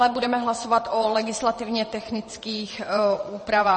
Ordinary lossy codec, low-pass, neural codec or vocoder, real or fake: MP3, 32 kbps; 9.9 kHz; vocoder, 22.05 kHz, 80 mel bands, WaveNeXt; fake